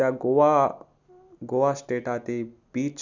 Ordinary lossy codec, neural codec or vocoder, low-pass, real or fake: none; none; 7.2 kHz; real